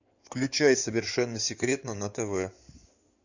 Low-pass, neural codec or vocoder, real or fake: 7.2 kHz; codec, 16 kHz in and 24 kHz out, 2.2 kbps, FireRedTTS-2 codec; fake